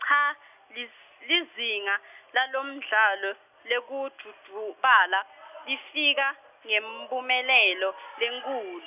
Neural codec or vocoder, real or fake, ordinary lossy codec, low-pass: none; real; none; 3.6 kHz